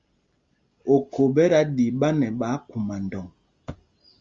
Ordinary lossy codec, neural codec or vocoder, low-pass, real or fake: Opus, 32 kbps; none; 7.2 kHz; real